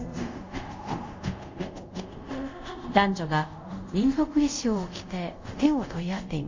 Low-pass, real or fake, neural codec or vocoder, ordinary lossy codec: 7.2 kHz; fake; codec, 24 kHz, 0.5 kbps, DualCodec; MP3, 48 kbps